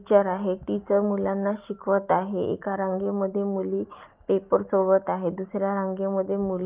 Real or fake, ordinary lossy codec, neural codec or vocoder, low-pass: real; Opus, 32 kbps; none; 3.6 kHz